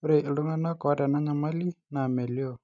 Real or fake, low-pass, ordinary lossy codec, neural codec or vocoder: real; 7.2 kHz; MP3, 96 kbps; none